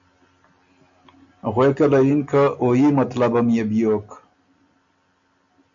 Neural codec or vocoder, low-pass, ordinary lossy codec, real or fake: none; 7.2 kHz; MP3, 64 kbps; real